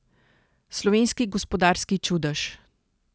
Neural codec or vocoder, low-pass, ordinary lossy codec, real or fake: none; none; none; real